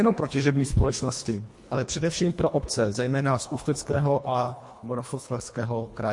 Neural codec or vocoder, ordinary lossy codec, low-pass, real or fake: codec, 24 kHz, 1.5 kbps, HILCodec; MP3, 48 kbps; 10.8 kHz; fake